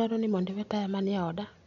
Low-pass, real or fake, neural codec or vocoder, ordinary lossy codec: 7.2 kHz; real; none; none